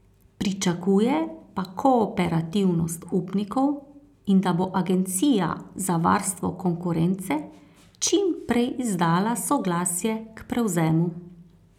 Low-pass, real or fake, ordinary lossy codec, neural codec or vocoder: 19.8 kHz; real; none; none